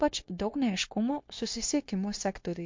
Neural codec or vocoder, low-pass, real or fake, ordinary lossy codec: codec, 16 kHz, 0.9 kbps, LongCat-Audio-Codec; 7.2 kHz; fake; MP3, 48 kbps